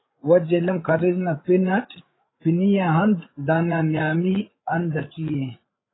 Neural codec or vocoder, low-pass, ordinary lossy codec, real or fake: codec, 16 kHz, 8 kbps, FreqCodec, larger model; 7.2 kHz; AAC, 16 kbps; fake